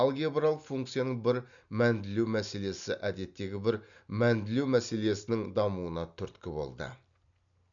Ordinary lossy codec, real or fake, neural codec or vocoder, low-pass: none; real; none; 7.2 kHz